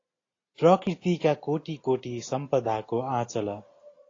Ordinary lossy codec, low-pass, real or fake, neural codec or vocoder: AAC, 32 kbps; 7.2 kHz; real; none